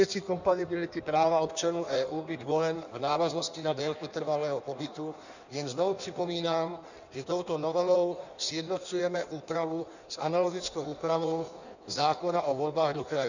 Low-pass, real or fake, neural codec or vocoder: 7.2 kHz; fake; codec, 16 kHz in and 24 kHz out, 1.1 kbps, FireRedTTS-2 codec